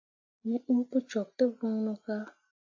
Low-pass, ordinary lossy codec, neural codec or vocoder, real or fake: 7.2 kHz; AAC, 48 kbps; none; real